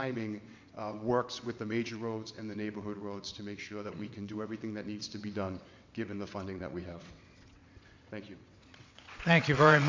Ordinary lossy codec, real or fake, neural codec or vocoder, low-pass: MP3, 48 kbps; fake; vocoder, 22.05 kHz, 80 mel bands, WaveNeXt; 7.2 kHz